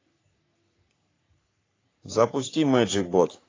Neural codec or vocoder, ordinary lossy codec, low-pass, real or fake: codec, 44.1 kHz, 3.4 kbps, Pupu-Codec; AAC, 32 kbps; 7.2 kHz; fake